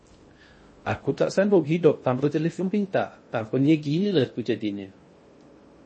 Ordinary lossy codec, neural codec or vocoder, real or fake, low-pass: MP3, 32 kbps; codec, 16 kHz in and 24 kHz out, 0.6 kbps, FocalCodec, streaming, 2048 codes; fake; 9.9 kHz